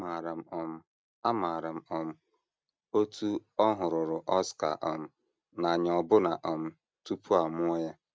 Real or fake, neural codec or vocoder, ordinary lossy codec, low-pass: real; none; none; none